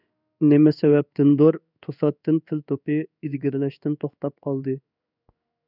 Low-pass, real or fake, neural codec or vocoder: 5.4 kHz; fake; codec, 16 kHz in and 24 kHz out, 1 kbps, XY-Tokenizer